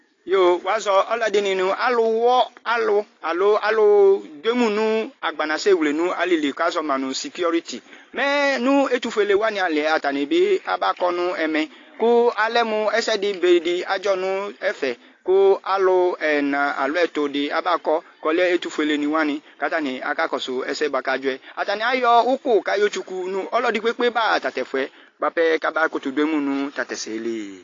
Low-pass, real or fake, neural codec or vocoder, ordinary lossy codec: 7.2 kHz; real; none; AAC, 32 kbps